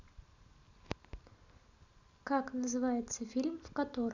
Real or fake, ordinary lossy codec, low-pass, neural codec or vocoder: real; none; 7.2 kHz; none